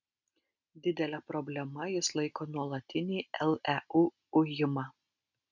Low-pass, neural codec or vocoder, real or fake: 7.2 kHz; none; real